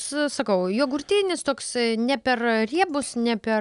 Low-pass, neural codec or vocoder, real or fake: 10.8 kHz; none; real